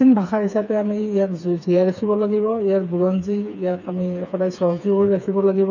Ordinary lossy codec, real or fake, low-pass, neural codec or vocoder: none; fake; 7.2 kHz; codec, 16 kHz, 4 kbps, FreqCodec, smaller model